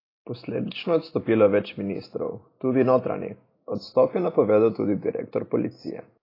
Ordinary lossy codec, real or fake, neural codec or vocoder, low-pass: AAC, 24 kbps; real; none; 5.4 kHz